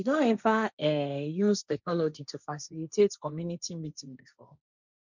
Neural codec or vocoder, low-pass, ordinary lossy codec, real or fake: codec, 16 kHz, 1.1 kbps, Voila-Tokenizer; 7.2 kHz; none; fake